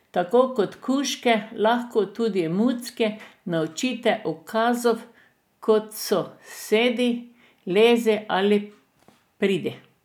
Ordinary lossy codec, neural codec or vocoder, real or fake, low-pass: none; none; real; 19.8 kHz